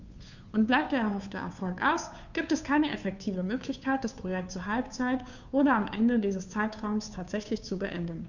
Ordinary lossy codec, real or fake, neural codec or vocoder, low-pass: none; fake; codec, 16 kHz, 2 kbps, FunCodec, trained on Chinese and English, 25 frames a second; 7.2 kHz